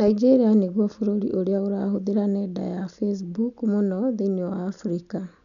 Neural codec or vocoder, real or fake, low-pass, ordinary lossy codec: none; real; 7.2 kHz; none